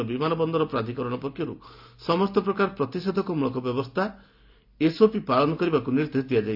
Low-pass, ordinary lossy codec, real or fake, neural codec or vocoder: 5.4 kHz; AAC, 48 kbps; real; none